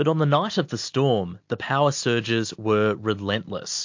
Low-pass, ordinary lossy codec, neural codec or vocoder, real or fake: 7.2 kHz; MP3, 48 kbps; none; real